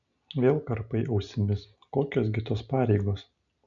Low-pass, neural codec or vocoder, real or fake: 7.2 kHz; none; real